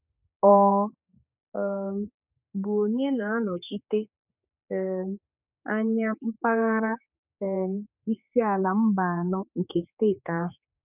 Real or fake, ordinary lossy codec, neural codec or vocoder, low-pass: fake; none; codec, 16 kHz, 4 kbps, X-Codec, HuBERT features, trained on general audio; 3.6 kHz